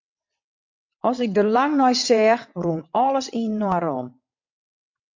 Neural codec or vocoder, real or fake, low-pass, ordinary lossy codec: vocoder, 22.05 kHz, 80 mel bands, WaveNeXt; fake; 7.2 kHz; MP3, 64 kbps